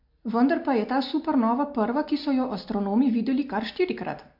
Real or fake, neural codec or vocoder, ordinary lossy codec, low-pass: real; none; MP3, 48 kbps; 5.4 kHz